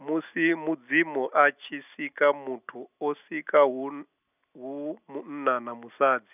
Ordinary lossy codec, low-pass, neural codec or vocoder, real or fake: none; 3.6 kHz; none; real